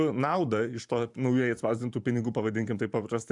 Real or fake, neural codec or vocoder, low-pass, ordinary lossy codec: fake; vocoder, 44.1 kHz, 128 mel bands every 512 samples, BigVGAN v2; 10.8 kHz; MP3, 96 kbps